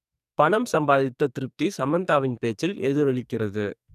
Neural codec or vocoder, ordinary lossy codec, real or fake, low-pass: codec, 44.1 kHz, 2.6 kbps, SNAC; none; fake; 14.4 kHz